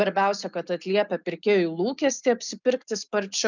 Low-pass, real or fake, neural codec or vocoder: 7.2 kHz; real; none